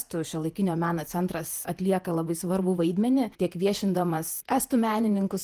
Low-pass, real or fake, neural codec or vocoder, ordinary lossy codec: 14.4 kHz; fake; vocoder, 48 kHz, 128 mel bands, Vocos; Opus, 24 kbps